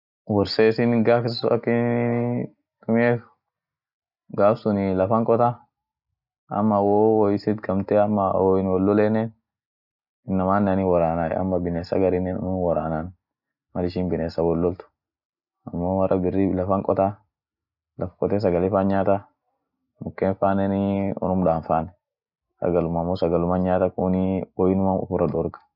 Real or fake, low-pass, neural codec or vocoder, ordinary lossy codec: real; 5.4 kHz; none; none